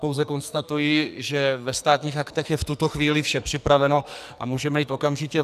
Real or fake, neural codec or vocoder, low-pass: fake; codec, 44.1 kHz, 2.6 kbps, SNAC; 14.4 kHz